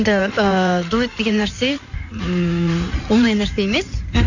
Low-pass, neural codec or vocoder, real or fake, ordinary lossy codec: 7.2 kHz; codec, 16 kHz in and 24 kHz out, 2.2 kbps, FireRedTTS-2 codec; fake; none